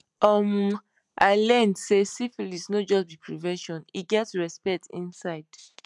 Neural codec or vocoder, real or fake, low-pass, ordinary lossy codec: none; real; 10.8 kHz; none